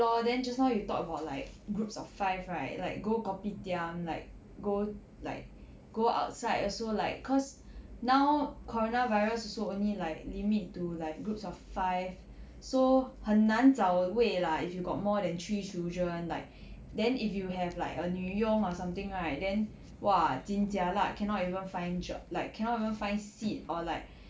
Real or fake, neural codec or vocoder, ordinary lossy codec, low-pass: real; none; none; none